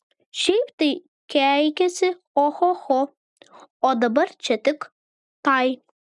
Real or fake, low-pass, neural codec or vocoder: real; 10.8 kHz; none